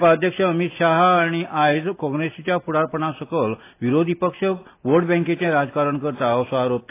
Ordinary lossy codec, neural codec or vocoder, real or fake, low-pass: AAC, 24 kbps; none; real; 3.6 kHz